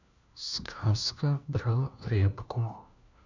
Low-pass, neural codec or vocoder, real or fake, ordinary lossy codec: 7.2 kHz; codec, 16 kHz, 1 kbps, FunCodec, trained on LibriTTS, 50 frames a second; fake; none